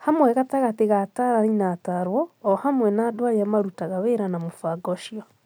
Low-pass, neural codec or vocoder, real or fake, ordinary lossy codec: none; none; real; none